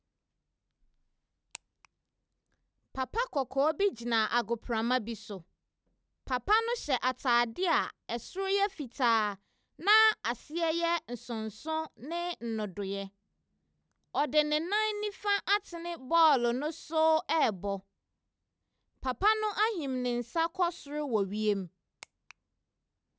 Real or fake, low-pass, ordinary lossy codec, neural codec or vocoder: real; none; none; none